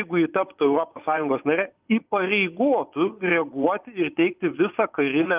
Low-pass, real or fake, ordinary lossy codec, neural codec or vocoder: 3.6 kHz; fake; Opus, 32 kbps; vocoder, 24 kHz, 100 mel bands, Vocos